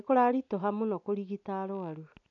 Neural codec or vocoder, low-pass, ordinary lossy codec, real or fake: none; 7.2 kHz; none; real